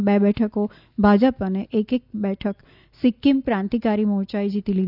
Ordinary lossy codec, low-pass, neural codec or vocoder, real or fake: none; 5.4 kHz; none; real